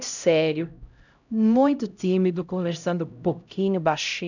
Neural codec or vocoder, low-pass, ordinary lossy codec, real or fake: codec, 16 kHz, 0.5 kbps, X-Codec, HuBERT features, trained on LibriSpeech; 7.2 kHz; none; fake